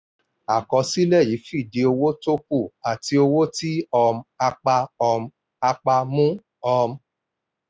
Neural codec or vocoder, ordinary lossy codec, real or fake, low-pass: none; none; real; none